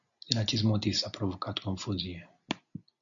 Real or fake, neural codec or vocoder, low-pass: real; none; 7.2 kHz